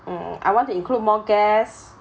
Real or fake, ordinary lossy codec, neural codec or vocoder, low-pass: real; none; none; none